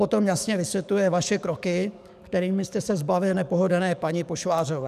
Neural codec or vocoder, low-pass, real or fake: codec, 44.1 kHz, 7.8 kbps, DAC; 14.4 kHz; fake